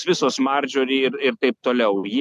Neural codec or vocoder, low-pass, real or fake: none; 14.4 kHz; real